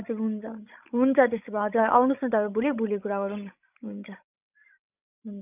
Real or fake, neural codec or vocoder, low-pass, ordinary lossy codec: fake; codec, 16 kHz, 16 kbps, FreqCodec, larger model; 3.6 kHz; none